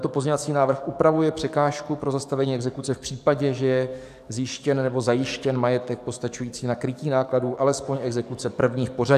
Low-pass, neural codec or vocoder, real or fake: 14.4 kHz; codec, 44.1 kHz, 7.8 kbps, DAC; fake